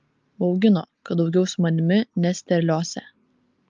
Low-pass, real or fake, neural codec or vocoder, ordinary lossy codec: 7.2 kHz; real; none; Opus, 24 kbps